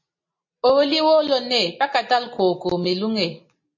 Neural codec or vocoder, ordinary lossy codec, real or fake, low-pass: none; MP3, 32 kbps; real; 7.2 kHz